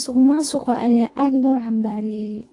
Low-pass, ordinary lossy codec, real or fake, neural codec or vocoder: 10.8 kHz; AAC, 48 kbps; fake; codec, 24 kHz, 1.5 kbps, HILCodec